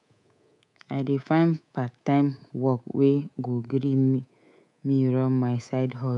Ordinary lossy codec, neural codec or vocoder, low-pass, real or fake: none; codec, 24 kHz, 3.1 kbps, DualCodec; 10.8 kHz; fake